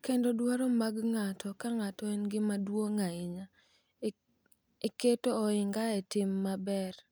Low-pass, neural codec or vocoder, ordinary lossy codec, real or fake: none; none; none; real